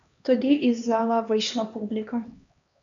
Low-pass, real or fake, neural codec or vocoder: 7.2 kHz; fake; codec, 16 kHz, 2 kbps, X-Codec, HuBERT features, trained on LibriSpeech